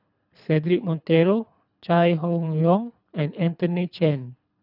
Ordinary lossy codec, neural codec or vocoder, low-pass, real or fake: none; codec, 24 kHz, 3 kbps, HILCodec; 5.4 kHz; fake